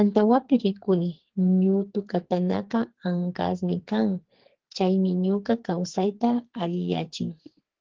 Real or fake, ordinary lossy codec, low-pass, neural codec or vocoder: fake; Opus, 16 kbps; 7.2 kHz; codec, 44.1 kHz, 2.6 kbps, SNAC